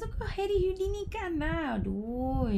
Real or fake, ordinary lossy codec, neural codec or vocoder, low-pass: real; none; none; 14.4 kHz